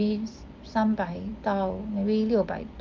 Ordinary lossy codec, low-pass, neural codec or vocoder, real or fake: Opus, 24 kbps; 7.2 kHz; none; real